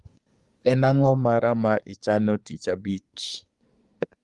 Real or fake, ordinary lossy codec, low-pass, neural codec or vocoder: fake; Opus, 32 kbps; 10.8 kHz; codec, 24 kHz, 1 kbps, SNAC